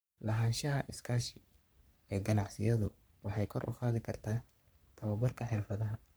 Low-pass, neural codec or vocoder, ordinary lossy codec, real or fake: none; codec, 44.1 kHz, 3.4 kbps, Pupu-Codec; none; fake